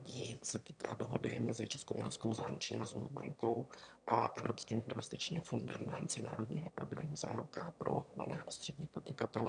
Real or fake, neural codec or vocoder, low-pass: fake; autoencoder, 22.05 kHz, a latent of 192 numbers a frame, VITS, trained on one speaker; 9.9 kHz